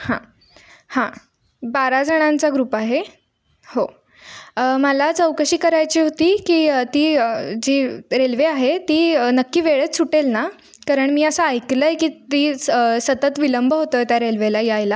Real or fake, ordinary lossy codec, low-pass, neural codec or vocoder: real; none; none; none